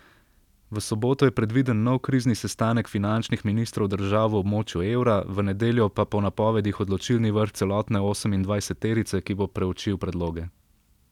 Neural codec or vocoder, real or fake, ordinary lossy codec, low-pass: none; real; none; 19.8 kHz